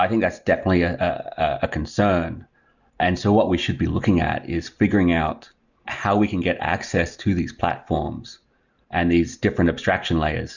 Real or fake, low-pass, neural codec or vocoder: real; 7.2 kHz; none